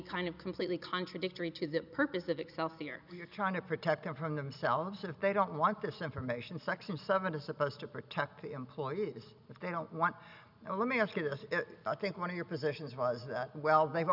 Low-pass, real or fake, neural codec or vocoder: 5.4 kHz; real; none